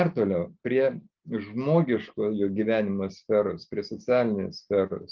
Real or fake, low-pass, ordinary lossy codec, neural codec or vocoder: real; 7.2 kHz; Opus, 32 kbps; none